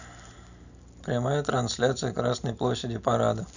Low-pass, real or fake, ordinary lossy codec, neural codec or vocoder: 7.2 kHz; real; none; none